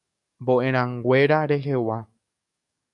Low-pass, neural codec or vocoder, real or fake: 10.8 kHz; codec, 44.1 kHz, 7.8 kbps, DAC; fake